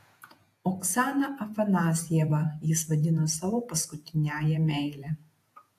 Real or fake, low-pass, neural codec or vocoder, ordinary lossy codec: fake; 14.4 kHz; vocoder, 48 kHz, 128 mel bands, Vocos; AAC, 64 kbps